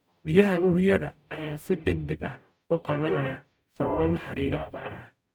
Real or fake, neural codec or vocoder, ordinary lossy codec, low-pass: fake; codec, 44.1 kHz, 0.9 kbps, DAC; none; 19.8 kHz